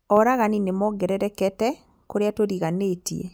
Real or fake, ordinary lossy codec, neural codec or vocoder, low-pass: real; none; none; none